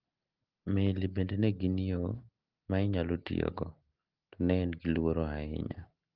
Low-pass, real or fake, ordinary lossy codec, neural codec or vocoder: 5.4 kHz; real; Opus, 16 kbps; none